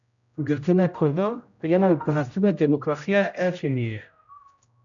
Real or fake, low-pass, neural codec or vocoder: fake; 7.2 kHz; codec, 16 kHz, 0.5 kbps, X-Codec, HuBERT features, trained on general audio